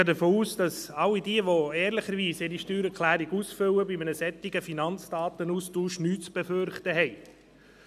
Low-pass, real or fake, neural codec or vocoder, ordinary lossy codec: 14.4 kHz; real; none; none